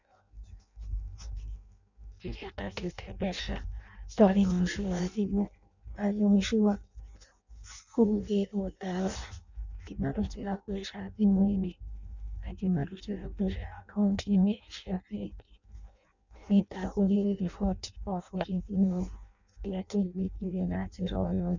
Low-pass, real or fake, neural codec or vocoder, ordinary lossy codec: 7.2 kHz; fake; codec, 16 kHz in and 24 kHz out, 0.6 kbps, FireRedTTS-2 codec; none